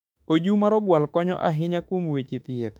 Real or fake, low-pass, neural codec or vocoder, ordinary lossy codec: fake; 19.8 kHz; autoencoder, 48 kHz, 32 numbers a frame, DAC-VAE, trained on Japanese speech; none